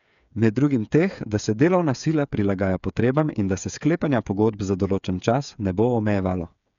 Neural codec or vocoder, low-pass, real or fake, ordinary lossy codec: codec, 16 kHz, 8 kbps, FreqCodec, smaller model; 7.2 kHz; fake; none